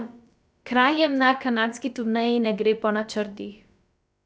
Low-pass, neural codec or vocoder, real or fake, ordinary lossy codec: none; codec, 16 kHz, about 1 kbps, DyCAST, with the encoder's durations; fake; none